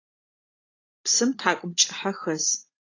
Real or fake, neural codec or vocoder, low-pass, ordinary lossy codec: real; none; 7.2 kHz; AAC, 32 kbps